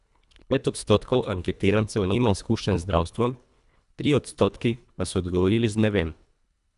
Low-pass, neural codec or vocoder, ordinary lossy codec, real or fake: 10.8 kHz; codec, 24 kHz, 1.5 kbps, HILCodec; none; fake